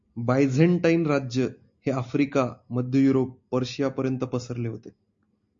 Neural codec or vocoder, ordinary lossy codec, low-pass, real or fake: none; MP3, 48 kbps; 7.2 kHz; real